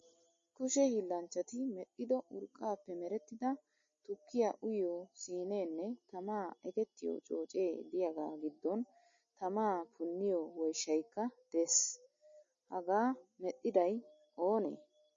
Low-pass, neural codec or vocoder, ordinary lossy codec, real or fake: 7.2 kHz; none; MP3, 32 kbps; real